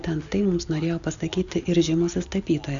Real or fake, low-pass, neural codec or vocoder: real; 7.2 kHz; none